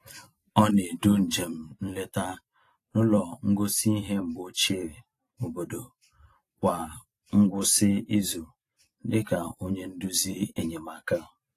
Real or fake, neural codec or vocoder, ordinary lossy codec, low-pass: fake; vocoder, 44.1 kHz, 128 mel bands every 256 samples, BigVGAN v2; AAC, 48 kbps; 14.4 kHz